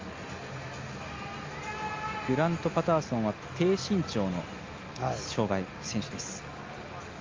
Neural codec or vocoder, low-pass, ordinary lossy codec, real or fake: none; 7.2 kHz; Opus, 32 kbps; real